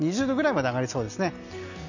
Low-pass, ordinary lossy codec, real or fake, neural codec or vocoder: 7.2 kHz; none; real; none